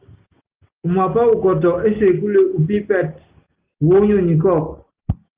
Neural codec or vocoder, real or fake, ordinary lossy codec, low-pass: none; real; Opus, 32 kbps; 3.6 kHz